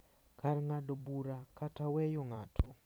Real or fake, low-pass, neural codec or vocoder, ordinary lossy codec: fake; none; vocoder, 44.1 kHz, 128 mel bands every 256 samples, BigVGAN v2; none